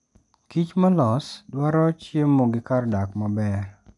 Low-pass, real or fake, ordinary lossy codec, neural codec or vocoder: 10.8 kHz; real; none; none